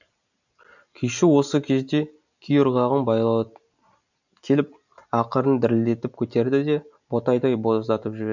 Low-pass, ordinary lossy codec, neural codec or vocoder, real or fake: 7.2 kHz; none; none; real